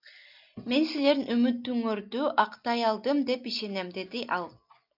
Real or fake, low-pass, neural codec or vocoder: real; 5.4 kHz; none